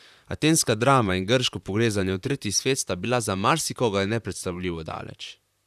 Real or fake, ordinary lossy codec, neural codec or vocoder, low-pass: fake; none; vocoder, 44.1 kHz, 128 mel bands, Pupu-Vocoder; 14.4 kHz